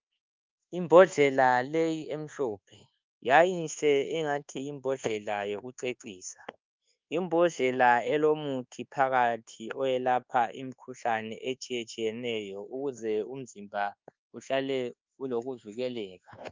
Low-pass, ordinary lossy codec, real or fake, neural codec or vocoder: 7.2 kHz; Opus, 24 kbps; fake; codec, 24 kHz, 1.2 kbps, DualCodec